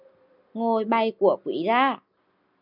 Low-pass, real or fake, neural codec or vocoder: 5.4 kHz; real; none